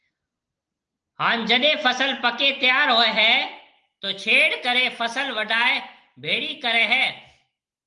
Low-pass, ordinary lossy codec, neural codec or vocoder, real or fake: 10.8 kHz; Opus, 24 kbps; none; real